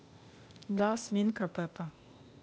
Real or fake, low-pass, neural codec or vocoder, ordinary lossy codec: fake; none; codec, 16 kHz, 0.8 kbps, ZipCodec; none